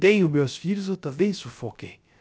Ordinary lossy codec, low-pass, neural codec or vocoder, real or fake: none; none; codec, 16 kHz, 0.3 kbps, FocalCodec; fake